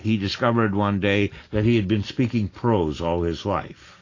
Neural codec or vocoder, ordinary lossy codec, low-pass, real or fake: none; AAC, 32 kbps; 7.2 kHz; real